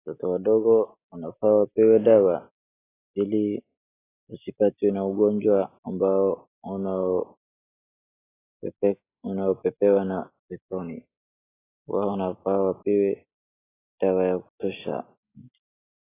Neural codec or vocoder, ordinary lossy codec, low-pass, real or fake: none; AAC, 16 kbps; 3.6 kHz; real